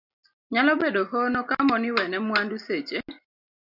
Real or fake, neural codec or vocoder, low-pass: real; none; 5.4 kHz